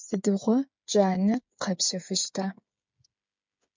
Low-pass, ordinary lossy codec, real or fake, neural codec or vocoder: 7.2 kHz; MP3, 64 kbps; fake; codec, 16 kHz, 8 kbps, FreqCodec, smaller model